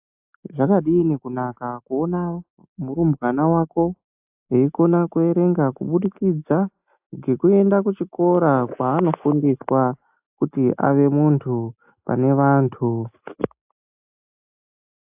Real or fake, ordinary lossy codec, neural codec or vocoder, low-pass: real; AAC, 32 kbps; none; 3.6 kHz